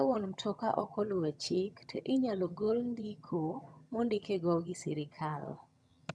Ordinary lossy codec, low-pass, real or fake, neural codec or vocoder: none; none; fake; vocoder, 22.05 kHz, 80 mel bands, HiFi-GAN